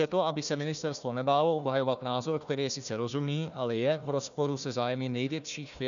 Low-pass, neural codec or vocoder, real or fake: 7.2 kHz; codec, 16 kHz, 1 kbps, FunCodec, trained on Chinese and English, 50 frames a second; fake